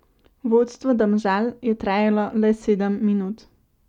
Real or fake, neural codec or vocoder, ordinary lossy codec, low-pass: real; none; none; 19.8 kHz